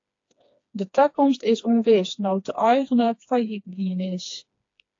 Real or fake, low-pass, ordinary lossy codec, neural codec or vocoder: fake; 7.2 kHz; AAC, 48 kbps; codec, 16 kHz, 2 kbps, FreqCodec, smaller model